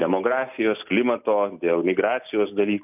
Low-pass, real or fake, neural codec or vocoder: 3.6 kHz; real; none